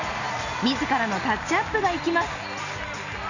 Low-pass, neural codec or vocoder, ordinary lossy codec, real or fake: 7.2 kHz; none; none; real